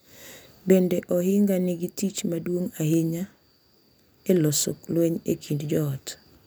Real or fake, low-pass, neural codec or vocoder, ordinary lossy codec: real; none; none; none